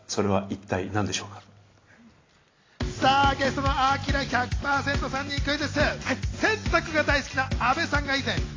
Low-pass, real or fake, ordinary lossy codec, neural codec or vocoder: 7.2 kHz; real; AAC, 32 kbps; none